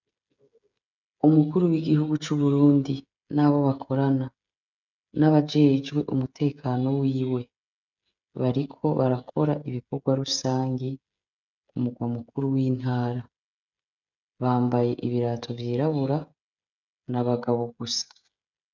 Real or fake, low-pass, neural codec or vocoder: fake; 7.2 kHz; codec, 16 kHz, 16 kbps, FreqCodec, smaller model